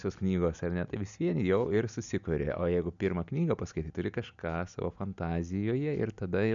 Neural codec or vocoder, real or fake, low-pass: none; real; 7.2 kHz